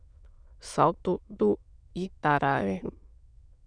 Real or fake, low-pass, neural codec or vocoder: fake; 9.9 kHz; autoencoder, 22.05 kHz, a latent of 192 numbers a frame, VITS, trained on many speakers